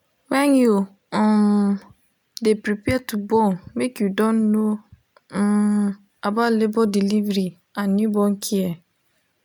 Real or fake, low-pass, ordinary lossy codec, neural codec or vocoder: real; none; none; none